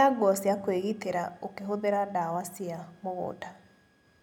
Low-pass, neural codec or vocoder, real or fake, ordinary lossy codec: 19.8 kHz; none; real; none